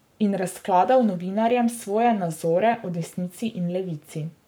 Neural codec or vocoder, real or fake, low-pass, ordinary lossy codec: codec, 44.1 kHz, 7.8 kbps, Pupu-Codec; fake; none; none